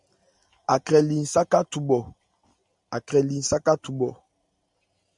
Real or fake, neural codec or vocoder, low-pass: real; none; 10.8 kHz